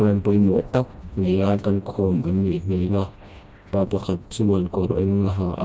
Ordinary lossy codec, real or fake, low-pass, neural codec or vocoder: none; fake; none; codec, 16 kHz, 1 kbps, FreqCodec, smaller model